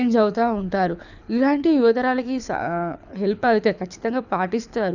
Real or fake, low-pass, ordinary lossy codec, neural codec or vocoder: fake; 7.2 kHz; none; codec, 24 kHz, 6 kbps, HILCodec